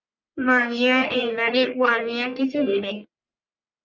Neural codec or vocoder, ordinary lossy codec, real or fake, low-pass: codec, 44.1 kHz, 1.7 kbps, Pupu-Codec; Opus, 64 kbps; fake; 7.2 kHz